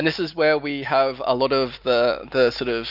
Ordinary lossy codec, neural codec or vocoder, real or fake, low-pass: Opus, 64 kbps; none; real; 5.4 kHz